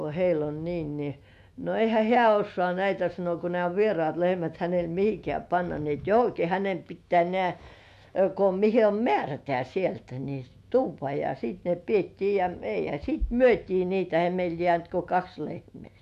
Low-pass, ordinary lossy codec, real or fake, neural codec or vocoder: 14.4 kHz; MP3, 64 kbps; fake; autoencoder, 48 kHz, 128 numbers a frame, DAC-VAE, trained on Japanese speech